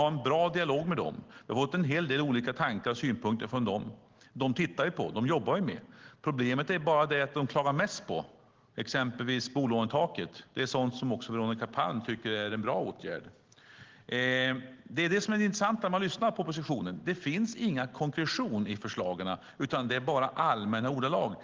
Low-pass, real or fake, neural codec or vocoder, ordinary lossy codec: 7.2 kHz; real; none; Opus, 16 kbps